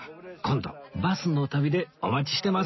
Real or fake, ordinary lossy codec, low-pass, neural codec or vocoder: real; MP3, 24 kbps; 7.2 kHz; none